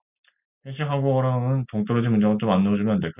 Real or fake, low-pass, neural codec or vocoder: real; 3.6 kHz; none